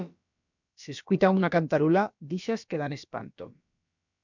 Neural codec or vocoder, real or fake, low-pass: codec, 16 kHz, about 1 kbps, DyCAST, with the encoder's durations; fake; 7.2 kHz